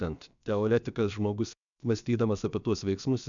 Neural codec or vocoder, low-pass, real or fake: codec, 16 kHz, about 1 kbps, DyCAST, with the encoder's durations; 7.2 kHz; fake